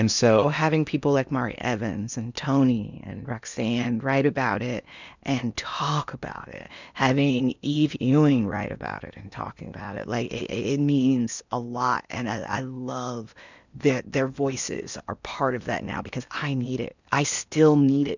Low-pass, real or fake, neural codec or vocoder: 7.2 kHz; fake; codec, 16 kHz in and 24 kHz out, 0.8 kbps, FocalCodec, streaming, 65536 codes